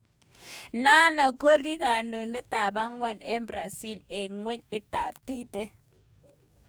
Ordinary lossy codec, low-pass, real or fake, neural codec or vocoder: none; none; fake; codec, 44.1 kHz, 2.6 kbps, DAC